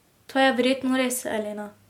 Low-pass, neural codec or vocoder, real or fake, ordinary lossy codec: 19.8 kHz; none; real; MP3, 96 kbps